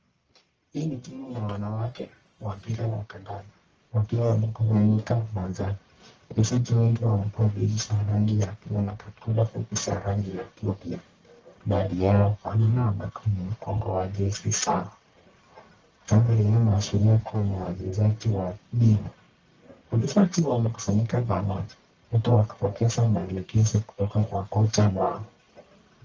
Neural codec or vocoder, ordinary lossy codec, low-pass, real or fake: codec, 44.1 kHz, 1.7 kbps, Pupu-Codec; Opus, 24 kbps; 7.2 kHz; fake